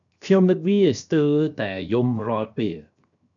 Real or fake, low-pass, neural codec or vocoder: fake; 7.2 kHz; codec, 16 kHz, 0.7 kbps, FocalCodec